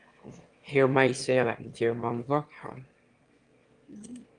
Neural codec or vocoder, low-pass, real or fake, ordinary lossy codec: autoencoder, 22.05 kHz, a latent of 192 numbers a frame, VITS, trained on one speaker; 9.9 kHz; fake; Opus, 32 kbps